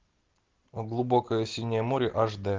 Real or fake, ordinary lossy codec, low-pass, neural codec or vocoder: real; Opus, 16 kbps; 7.2 kHz; none